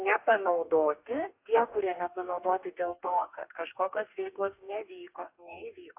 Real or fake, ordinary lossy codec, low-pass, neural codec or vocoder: fake; AAC, 32 kbps; 3.6 kHz; codec, 44.1 kHz, 2.6 kbps, DAC